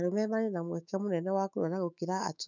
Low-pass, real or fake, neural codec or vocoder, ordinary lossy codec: 7.2 kHz; fake; autoencoder, 48 kHz, 128 numbers a frame, DAC-VAE, trained on Japanese speech; none